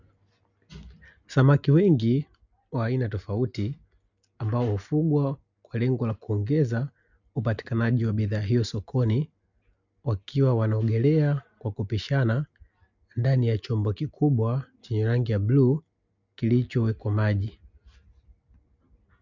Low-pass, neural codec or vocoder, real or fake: 7.2 kHz; none; real